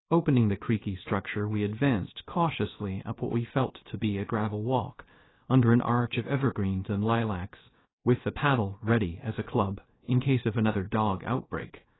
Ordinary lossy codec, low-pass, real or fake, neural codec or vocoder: AAC, 16 kbps; 7.2 kHz; fake; codec, 16 kHz, 0.7 kbps, FocalCodec